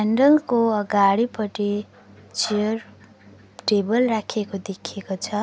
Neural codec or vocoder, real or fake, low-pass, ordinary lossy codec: none; real; none; none